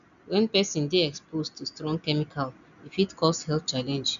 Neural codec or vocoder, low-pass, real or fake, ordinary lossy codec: none; 7.2 kHz; real; none